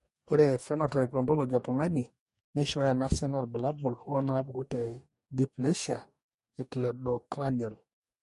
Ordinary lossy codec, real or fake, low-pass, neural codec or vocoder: MP3, 48 kbps; fake; 14.4 kHz; codec, 44.1 kHz, 2.6 kbps, DAC